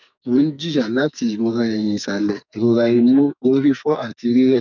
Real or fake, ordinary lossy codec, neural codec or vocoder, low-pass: fake; none; codec, 44.1 kHz, 2.6 kbps, SNAC; 7.2 kHz